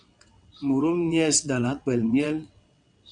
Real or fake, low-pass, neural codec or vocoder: fake; 9.9 kHz; vocoder, 22.05 kHz, 80 mel bands, WaveNeXt